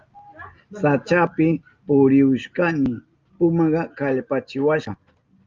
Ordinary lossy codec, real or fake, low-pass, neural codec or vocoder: Opus, 24 kbps; real; 7.2 kHz; none